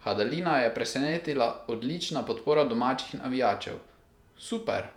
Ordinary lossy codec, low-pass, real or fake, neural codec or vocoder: none; 19.8 kHz; fake; vocoder, 48 kHz, 128 mel bands, Vocos